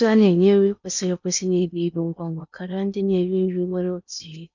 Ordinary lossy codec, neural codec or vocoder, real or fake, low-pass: none; codec, 16 kHz in and 24 kHz out, 0.8 kbps, FocalCodec, streaming, 65536 codes; fake; 7.2 kHz